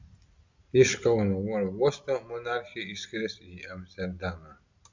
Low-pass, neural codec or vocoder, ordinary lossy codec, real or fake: 7.2 kHz; none; MP3, 64 kbps; real